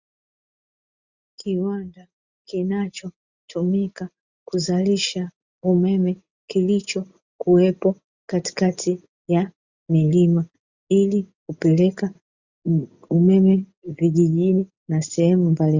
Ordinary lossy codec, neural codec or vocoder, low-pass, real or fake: Opus, 64 kbps; none; 7.2 kHz; real